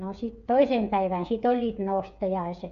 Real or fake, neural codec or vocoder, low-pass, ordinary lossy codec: fake; codec, 16 kHz, 8 kbps, FreqCodec, smaller model; 7.2 kHz; none